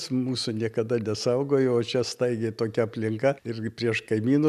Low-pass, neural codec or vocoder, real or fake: 14.4 kHz; none; real